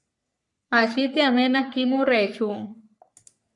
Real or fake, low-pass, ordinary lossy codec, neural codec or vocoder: fake; 10.8 kHz; MP3, 96 kbps; codec, 44.1 kHz, 3.4 kbps, Pupu-Codec